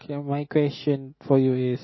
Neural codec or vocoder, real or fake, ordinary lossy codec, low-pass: none; real; MP3, 24 kbps; 7.2 kHz